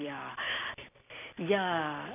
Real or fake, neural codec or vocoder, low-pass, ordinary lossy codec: fake; vocoder, 44.1 kHz, 128 mel bands every 256 samples, BigVGAN v2; 3.6 kHz; none